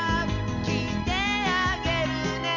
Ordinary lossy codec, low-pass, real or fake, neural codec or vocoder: none; 7.2 kHz; real; none